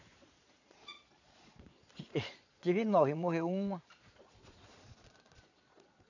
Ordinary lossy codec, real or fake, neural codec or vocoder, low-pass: none; fake; vocoder, 22.05 kHz, 80 mel bands, Vocos; 7.2 kHz